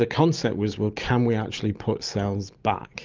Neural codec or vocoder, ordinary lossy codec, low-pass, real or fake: none; Opus, 32 kbps; 7.2 kHz; real